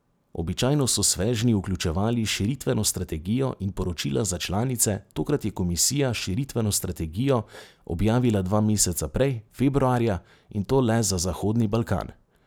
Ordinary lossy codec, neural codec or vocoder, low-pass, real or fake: none; none; none; real